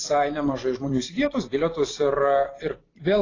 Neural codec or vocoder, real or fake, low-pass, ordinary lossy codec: vocoder, 44.1 kHz, 128 mel bands every 256 samples, BigVGAN v2; fake; 7.2 kHz; AAC, 32 kbps